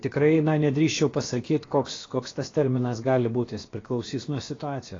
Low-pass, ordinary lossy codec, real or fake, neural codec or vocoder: 7.2 kHz; AAC, 32 kbps; fake; codec, 16 kHz, about 1 kbps, DyCAST, with the encoder's durations